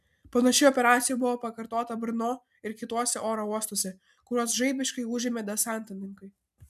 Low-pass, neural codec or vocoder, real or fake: 14.4 kHz; none; real